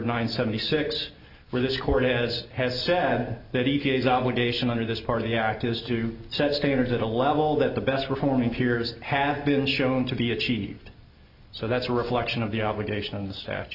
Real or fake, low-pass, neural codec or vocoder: real; 5.4 kHz; none